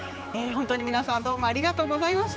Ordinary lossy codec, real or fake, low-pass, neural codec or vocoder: none; fake; none; codec, 16 kHz, 4 kbps, X-Codec, HuBERT features, trained on general audio